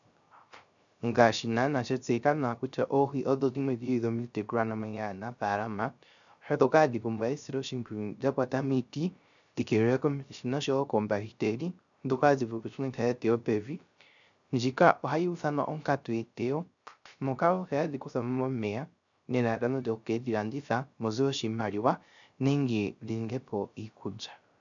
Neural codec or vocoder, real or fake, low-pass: codec, 16 kHz, 0.3 kbps, FocalCodec; fake; 7.2 kHz